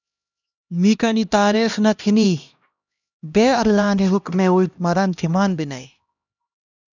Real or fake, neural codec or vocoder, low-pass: fake; codec, 16 kHz, 1 kbps, X-Codec, HuBERT features, trained on LibriSpeech; 7.2 kHz